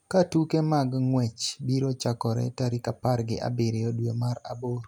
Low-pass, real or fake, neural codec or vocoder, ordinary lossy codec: 19.8 kHz; real; none; none